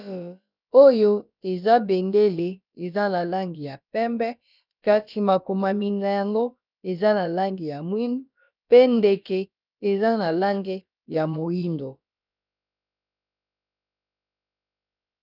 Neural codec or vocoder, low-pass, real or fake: codec, 16 kHz, about 1 kbps, DyCAST, with the encoder's durations; 5.4 kHz; fake